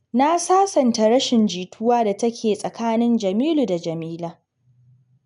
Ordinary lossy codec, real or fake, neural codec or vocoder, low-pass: none; real; none; 10.8 kHz